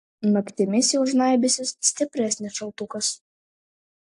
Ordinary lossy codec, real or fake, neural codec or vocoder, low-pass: AAC, 64 kbps; real; none; 10.8 kHz